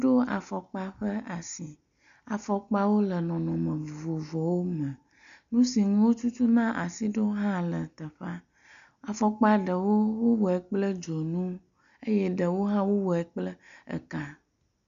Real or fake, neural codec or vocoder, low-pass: real; none; 7.2 kHz